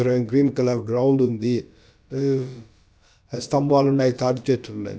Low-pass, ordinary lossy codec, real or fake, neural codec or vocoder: none; none; fake; codec, 16 kHz, about 1 kbps, DyCAST, with the encoder's durations